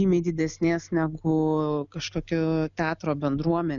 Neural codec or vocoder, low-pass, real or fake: none; 7.2 kHz; real